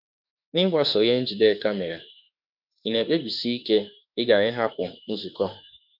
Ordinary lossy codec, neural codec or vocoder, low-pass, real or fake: none; autoencoder, 48 kHz, 32 numbers a frame, DAC-VAE, trained on Japanese speech; 5.4 kHz; fake